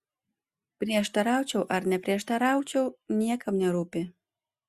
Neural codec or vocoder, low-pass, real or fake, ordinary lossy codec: none; 14.4 kHz; real; Opus, 64 kbps